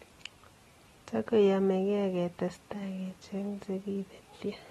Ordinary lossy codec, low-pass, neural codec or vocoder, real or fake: AAC, 32 kbps; 19.8 kHz; none; real